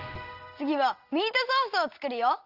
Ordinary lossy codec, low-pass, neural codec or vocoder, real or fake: Opus, 24 kbps; 5.4 kHz; none; real